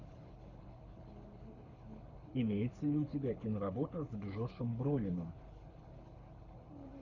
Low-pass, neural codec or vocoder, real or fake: 7.2 kHz; codec, 24 kHz, 6 kbps, HILCodec; fake